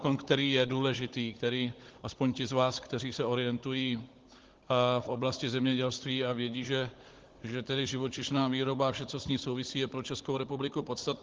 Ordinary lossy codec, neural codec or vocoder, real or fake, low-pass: Opus, 16 kbps; codec, 16 kHz, 16 kbps, FunCodec, trained on Chinese and English, 50 frames a second; fake; 7.2 kHz